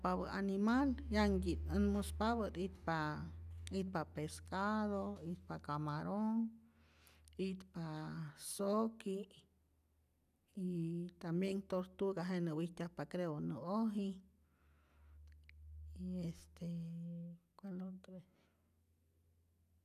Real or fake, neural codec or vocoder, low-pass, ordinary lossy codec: real; none; 14.4 kHz; none